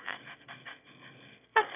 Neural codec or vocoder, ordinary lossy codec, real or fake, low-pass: autoencoder, 22.05 kHz, a latent of 192 numbers a frame, VITS, trained on one speaker; none; fake; 3.6 kHz